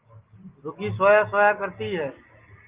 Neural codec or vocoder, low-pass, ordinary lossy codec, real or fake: none; 3.6 kHz; Opus, 32 kbps; real